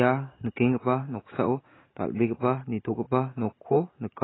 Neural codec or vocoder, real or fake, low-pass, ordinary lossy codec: none; real; 7.2 kHz; AAC, 16 kbps